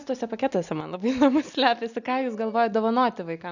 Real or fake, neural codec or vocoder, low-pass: real; none; 7.2 kHz